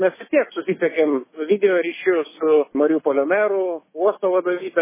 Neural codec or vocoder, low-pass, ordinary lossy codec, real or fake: autoencoder, 48 kHz, 128 numbers a frame, DAC-VAE, trained on Japanese speech; 3.6 kHz; MP3, 16 kbps; fake